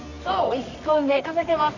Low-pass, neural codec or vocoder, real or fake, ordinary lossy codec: 7.2 kHz; codec, 24 kHz, 0.9 kbps, WavTokenizer, medium music audio release; fake; none